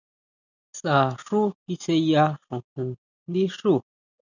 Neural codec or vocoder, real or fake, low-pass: none; real; 7.2 kHz